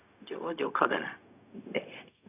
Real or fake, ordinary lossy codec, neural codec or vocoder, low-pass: fake; none; codec, 16 kHz, 0.4 kbps, LongCat-Audio-Codec; 3.6 kHz